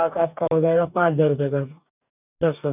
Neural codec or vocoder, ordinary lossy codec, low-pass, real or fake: codec, 44.1 kHz, 2.6 kbps, DAC; none; 3.6 kHz; fake